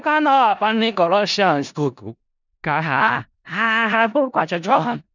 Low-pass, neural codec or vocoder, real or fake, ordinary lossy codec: 7.2 kHz; codec, 16 kHz in and 24 kHz out, 0.4 kbps, LongCat-Audio-Codec, four codebook decoder; fake; none